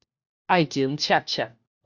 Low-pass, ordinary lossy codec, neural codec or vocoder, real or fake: 7.2 kHz; Opus, 64 kbps; codec, 16 kHz, 1 kbps, FunCodec, trained on LibriTTS, 50 frames a second; fake